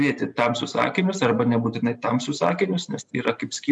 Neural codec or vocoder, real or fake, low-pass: none; real; 10.8 kHz